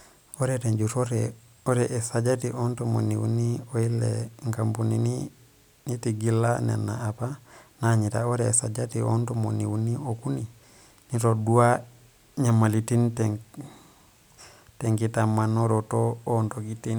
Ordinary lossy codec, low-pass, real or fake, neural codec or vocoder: none; none; real; none